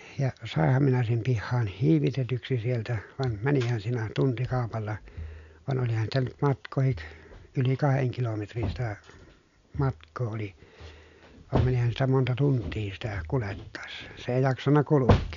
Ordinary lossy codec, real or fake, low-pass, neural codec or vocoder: none; real; 7.2 kHz; none